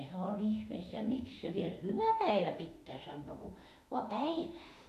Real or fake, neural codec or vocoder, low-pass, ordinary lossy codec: fake; autoencoder, 48 kHz, 32 numbers a frame, DAC-VAE, trained on Japanese speech; 14.4 kHz; AAC, 64 kbps